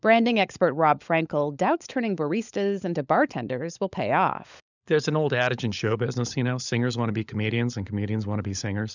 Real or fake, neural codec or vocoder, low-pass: fake; codec, 16 kHz, 16 kbps, FunCodec, trained on LibriTTS, 50 frames a second; 7.2 kHz